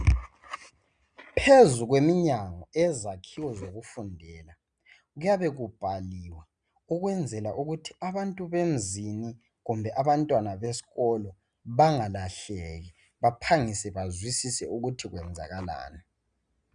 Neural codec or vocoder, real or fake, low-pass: none; real; 9.9 kHz